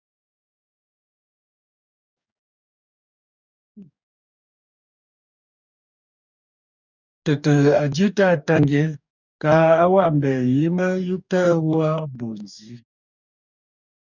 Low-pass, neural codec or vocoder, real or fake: 7.2 kHz; codec, 44.1 kHz, 2.6 kbps, DAC; fake